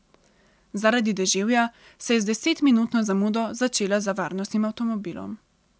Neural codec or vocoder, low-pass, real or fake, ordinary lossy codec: none; none; real; none